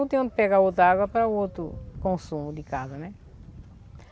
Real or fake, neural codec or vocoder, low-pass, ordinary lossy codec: real; none; none; none